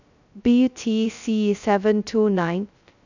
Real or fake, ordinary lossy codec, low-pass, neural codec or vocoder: fake; none; 7.2 kHz; codec, 16 kHz, 0.2 kbps, FocalCodec